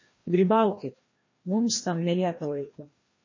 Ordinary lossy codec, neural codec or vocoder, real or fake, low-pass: MP3, 32 kbps; codec, 16 kHz, 1 kbps, FreqCodec, larger model; fake; 7.2 kHz